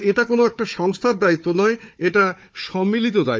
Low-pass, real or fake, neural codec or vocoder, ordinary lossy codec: none; fake; codec, 16 kHz, 4 kbps, FunCodec, trained on Chinese and English, 50 frames a second; none